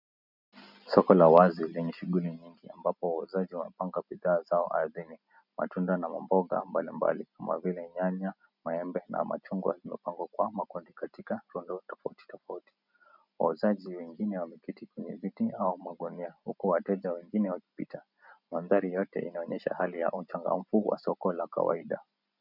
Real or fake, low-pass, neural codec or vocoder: real; 5.4 kHz; none